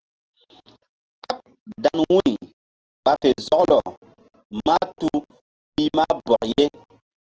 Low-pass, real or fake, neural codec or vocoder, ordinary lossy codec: 7.2 kHz; real; none; Opus, 16 kbps